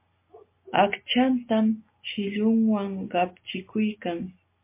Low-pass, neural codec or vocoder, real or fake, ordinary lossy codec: 3.6 kHz; none; real; MP3, 24 kbps